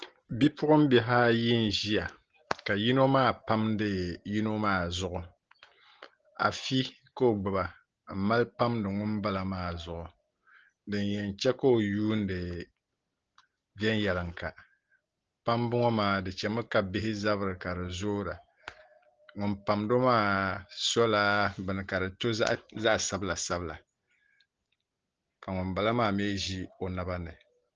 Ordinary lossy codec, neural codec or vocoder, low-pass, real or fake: Opus, 16 kbps; none; 7.2 kHz; real